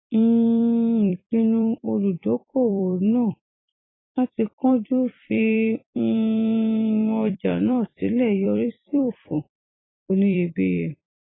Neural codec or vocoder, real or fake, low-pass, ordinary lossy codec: vocoder, 44.1 kHz, 128 mel bands every 256 samples, BigVGAN v2; fake; 7.2 kHz; AAC, 16 kbps